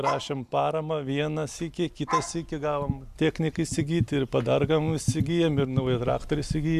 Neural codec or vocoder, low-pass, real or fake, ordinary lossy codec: none; 14.4 kHz; real; AAC, 96 kbps